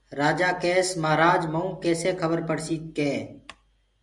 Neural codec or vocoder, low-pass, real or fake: none; 10.8 kHz; real